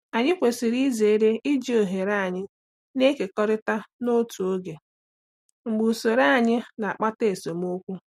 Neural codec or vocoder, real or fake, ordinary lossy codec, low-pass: none; real; MP3, 64 kbps; 19.8 kHz